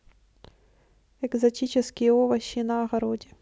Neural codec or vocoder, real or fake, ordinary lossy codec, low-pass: none; real; none; none